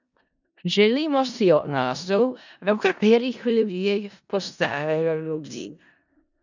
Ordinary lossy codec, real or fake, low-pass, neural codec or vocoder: none; fake; 7.2 kHz; codec, 16 kHz in and 24 kHz out, 0.4 kbps, LongCat-Audio-Codec, four codebook decoder